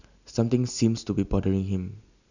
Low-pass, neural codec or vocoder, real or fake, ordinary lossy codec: 7.2 kHz; none; real; none